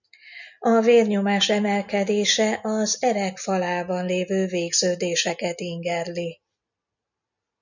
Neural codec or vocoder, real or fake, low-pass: none; real; 7.2 kHz